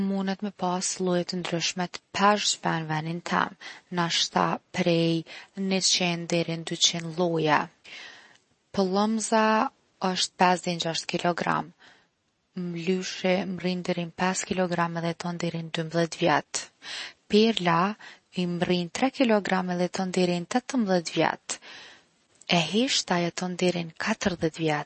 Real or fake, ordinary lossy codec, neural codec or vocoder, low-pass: real; MP3, 32 kbps; none; 10.8 kHz